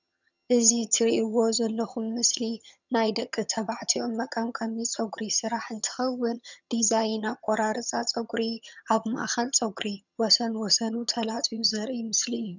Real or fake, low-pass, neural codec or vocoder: fake; 7.2 kHz; vocoder, 22.05 kHz, 80 mel bands, HiFi-GAN